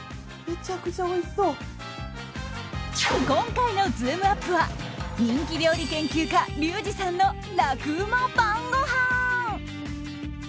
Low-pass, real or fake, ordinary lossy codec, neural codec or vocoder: none; real; none; none